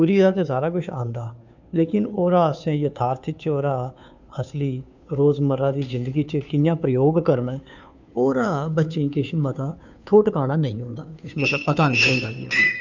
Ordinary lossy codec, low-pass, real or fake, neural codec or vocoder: none; 7.2 kHz; fake; codec, 16 kHz, 2 kbps, FunCodec, trained on Chinese and English, 25 frames a second